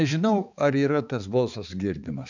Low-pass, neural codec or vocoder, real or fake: 7.2 kHz; codec, 16 kHz, 4 kbps, X-Codec, HuBERT features, trained on balanced general audio; fake